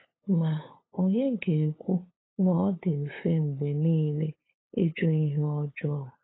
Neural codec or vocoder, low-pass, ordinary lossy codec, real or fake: codec, 16 kHz, 8 kbps, FunCodec, trained on LibriTTS, 25 frames a second; 7.2 kHz; AAC, 16 kbps; fake